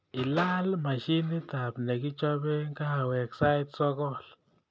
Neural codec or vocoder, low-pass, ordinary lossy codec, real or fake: none; none; none; real